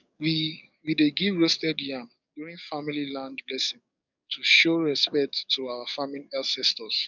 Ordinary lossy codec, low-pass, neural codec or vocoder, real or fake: none; 7.2 kHz; codec, 44.1 kHz, 7.8 kbps, DAC; fake